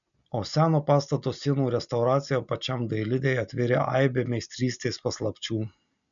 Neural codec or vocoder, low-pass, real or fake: none; 7.2 kHz; real